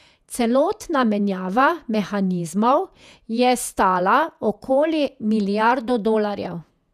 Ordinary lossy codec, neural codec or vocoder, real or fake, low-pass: none; vocoder, 48 kHz, 128 mel bands, Vocos; fake; 14.4 kHz